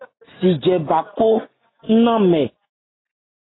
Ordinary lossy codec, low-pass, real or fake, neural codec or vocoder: AAC, 16 kbps; 7.2 kHz; real; none